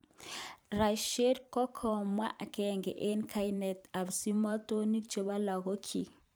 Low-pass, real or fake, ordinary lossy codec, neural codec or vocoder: none; fake; none; vocoder, 44.1 kHz, 128 mel bands every 512 samples, BigVGAN v2